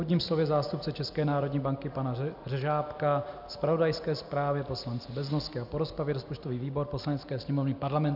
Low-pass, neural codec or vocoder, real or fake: 5.4 kHz; none; real